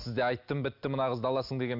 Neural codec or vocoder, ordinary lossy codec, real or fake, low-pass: none; none; real; 5.4 kHz